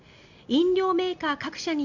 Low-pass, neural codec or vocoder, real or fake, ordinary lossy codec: 7.2 kHz; none; real; none